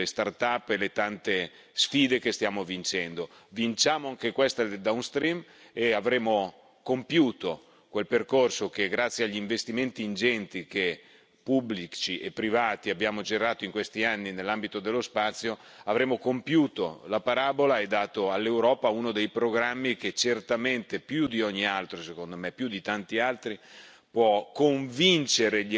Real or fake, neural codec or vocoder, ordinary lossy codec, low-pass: real; none; none; none